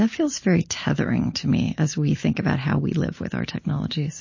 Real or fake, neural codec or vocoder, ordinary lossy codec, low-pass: real; none; MP3, 32 kbps; 7.2 kHz